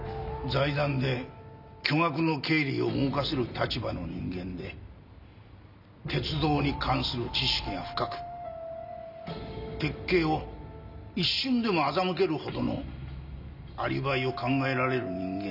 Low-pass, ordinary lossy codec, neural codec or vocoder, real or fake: 5.4 kHz; none; none; real